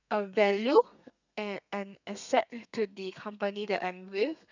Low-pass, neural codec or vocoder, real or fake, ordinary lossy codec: 7.2 kHz; codec, 32 kHz, 1.9 kbps, SNAC; fake; none